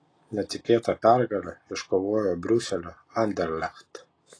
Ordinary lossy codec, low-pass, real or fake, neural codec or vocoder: AAC, 32 kbps; 9.9 kHz; real; none